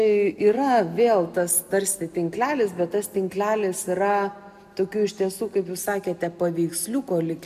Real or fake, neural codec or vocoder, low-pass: real; none; 14.4 kHz